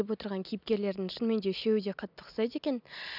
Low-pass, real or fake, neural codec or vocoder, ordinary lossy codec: 5.4 kHz; real; none; none